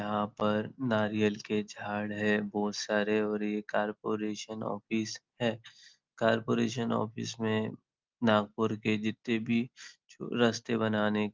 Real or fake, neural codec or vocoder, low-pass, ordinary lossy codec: real; none; 7.2 kHz; Opus, 24 kbps